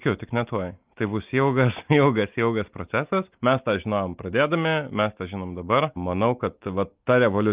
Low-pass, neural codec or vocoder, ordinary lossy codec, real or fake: 3.6 kHz; none; Opus, 32 kbps; real